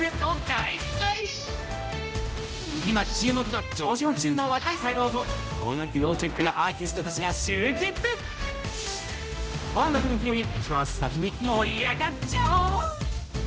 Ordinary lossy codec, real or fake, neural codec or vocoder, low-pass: none; fake; codec, 16 kHz, 0.5 kbps, X-Codec, HuBERT features, trained on general audio; none